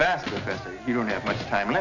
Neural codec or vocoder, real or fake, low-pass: none; real; 7.2 kHz